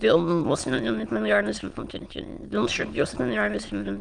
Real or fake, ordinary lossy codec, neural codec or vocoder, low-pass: fake; Opus, 24 kbps; autoencoder, 22.05 kHz, a latent of 192 numbers a frame, VITS, trained on many speakers; 9.9 kHz